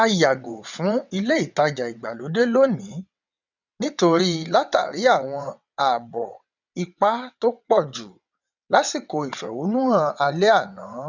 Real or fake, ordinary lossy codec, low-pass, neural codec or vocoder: fake; none; 7.2 kHz; vocoder, 22.05 kHz, 80 mel bands, WaveNeXt